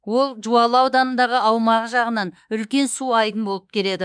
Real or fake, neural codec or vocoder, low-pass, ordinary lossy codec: fake; autoencoder, 48 kHz, 32 numbers a frame, DAC-VAE, trained on Japanese speech; 9.9 kHz; none